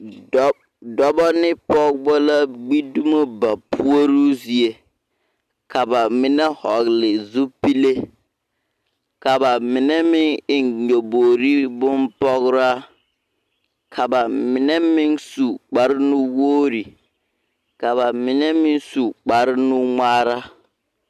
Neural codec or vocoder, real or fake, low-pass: none; real; 14.4 kHz